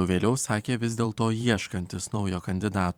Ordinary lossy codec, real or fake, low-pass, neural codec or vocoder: Opus, 64 kbps; fake; 19.8 kHz; vocoder, 44.1 kHz, 128 mel bands every 512 samples, BigVGAN v2